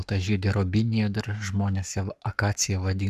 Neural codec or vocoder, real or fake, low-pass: codec, 44.1 kHz, 7.8 kbps, Pupu-Codec; fake; 14.4 kHz